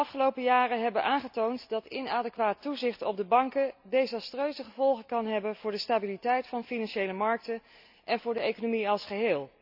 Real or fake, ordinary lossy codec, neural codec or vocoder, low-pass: real; none; none; 5.4 kHz